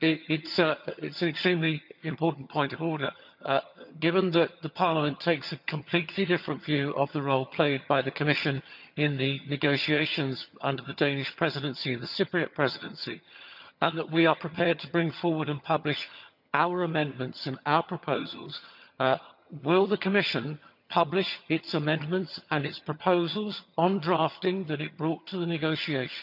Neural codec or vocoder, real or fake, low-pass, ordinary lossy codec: vocoder, 22.05 kHz, 80 mel bands, HiFi-GAN; fake; 5.4 kHz; none